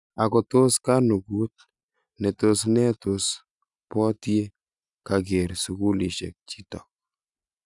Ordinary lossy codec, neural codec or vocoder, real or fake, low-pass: none; none; real; 10.8 kHz